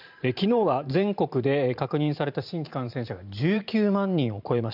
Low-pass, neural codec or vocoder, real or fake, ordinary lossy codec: 5.4 kHz; vocoder, 44.1 kHz, 128 mel bands every 512 samples, BigVGAN v2; fake; none